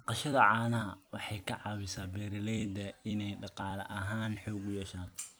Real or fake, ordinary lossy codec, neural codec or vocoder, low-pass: fake; none; vocoder, 44.1 kHz, 128 mel bands every 256 samples, BigVGAN v2; none